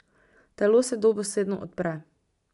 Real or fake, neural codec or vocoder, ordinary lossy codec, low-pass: real; none; none; 10.8 kHz